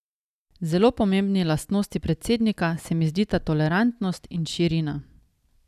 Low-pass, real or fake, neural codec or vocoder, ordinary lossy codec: 14.4 kHz; real; none; none